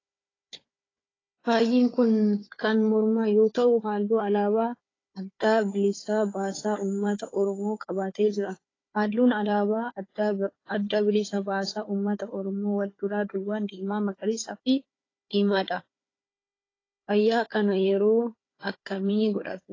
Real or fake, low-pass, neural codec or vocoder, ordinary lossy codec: fake; 7.2 kHz; codec, 16 kHz, 4 kbps, FunCodec, trained on Chinese and English, 50 frames a second; AAC, 32 kbps